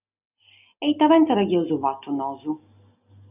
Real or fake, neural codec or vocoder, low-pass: real; none; 3.6 kHz